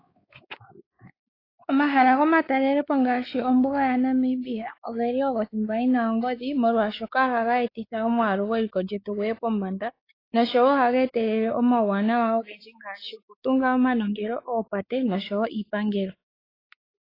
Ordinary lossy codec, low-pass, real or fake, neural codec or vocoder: AAC, 24 kbps; 5.4 kHz; fake; codec, 16 kHz, 4 kbps, X-Codec, WavLM features, trained on Multilingual LibriSpeech